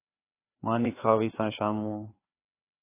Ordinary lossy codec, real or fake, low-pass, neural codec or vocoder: AAC, 24 kbps; fake; 3.6 kHz; codec, 16 kHz, 4 kbps, FreqCodec, larger model